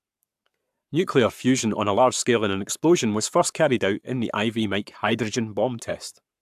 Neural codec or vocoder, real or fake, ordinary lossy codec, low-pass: codec, 44.1 kHz, 7.8 kbps, Pupu-Codec; fake; AAC, 96 kbps; 14.4 kHz